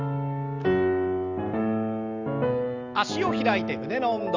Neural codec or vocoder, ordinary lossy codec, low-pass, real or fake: none; Opus, 32 kbps; 7.2 kHz; real